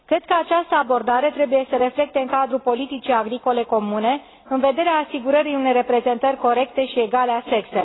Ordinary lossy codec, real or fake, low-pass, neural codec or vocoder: AAC, 16 kbps; real; 7.2 kHz; none